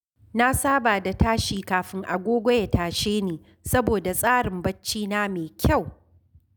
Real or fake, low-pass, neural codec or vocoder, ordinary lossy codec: real; none; none; none